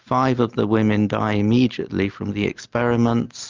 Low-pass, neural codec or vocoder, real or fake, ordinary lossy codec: 7.2 kHz; none; real; Opus, 24 kbps